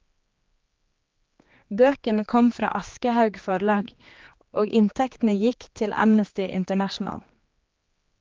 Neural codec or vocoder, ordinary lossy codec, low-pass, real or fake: codec, 16 kHz, 2 kbps, X-Codec, HuBERT features, trained on general audio; Opus, 24 kbps; 7.2 kHz; fake